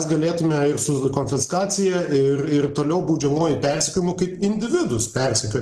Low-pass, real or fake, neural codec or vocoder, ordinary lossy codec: 14.4 kHz; real; none; Opus, 16 kbps